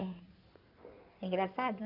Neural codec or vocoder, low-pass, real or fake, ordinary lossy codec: codec, 16 kHz in and 24 kHz out, 2.2 kbps, FireRedTTS-2 codec; 5.4 kHz; fake; none